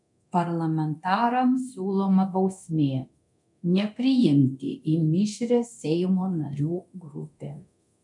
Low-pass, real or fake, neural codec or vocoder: 10.8 kHz; fake; codec, 24 kHz, 0.9 kbps, DualCodec